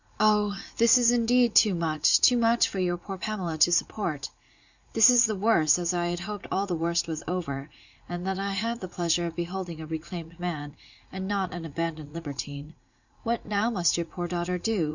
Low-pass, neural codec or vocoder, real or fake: 7.2 kHz; vocoder, 44.1 kHz, 80 mel bands, Vocos; fake